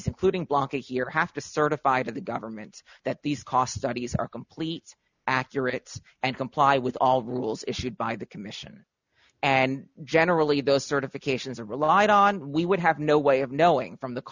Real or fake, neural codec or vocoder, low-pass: real; none; 7.2 kHz